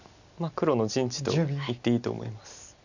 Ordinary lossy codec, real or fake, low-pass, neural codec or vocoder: none; real; 7.2 kHz; none